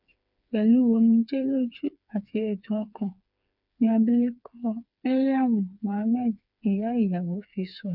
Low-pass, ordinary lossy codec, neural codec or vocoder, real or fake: 5.4 kHz; none; codec, 16 kHz, 4 kbps, FreqCodec, smaller model; fake